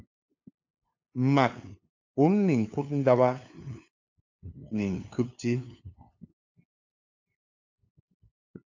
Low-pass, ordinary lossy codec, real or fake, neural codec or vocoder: 7.2 kHz; AAC, 48 kbps; fake; codec, 16 kHz, 2 kbps, FunCodec, trained on LibriTTS, 25 frames a second